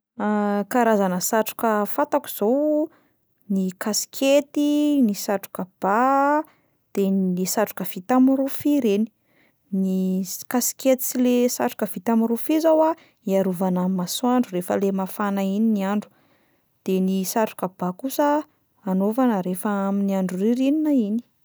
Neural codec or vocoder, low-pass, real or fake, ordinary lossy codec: none; none; real; none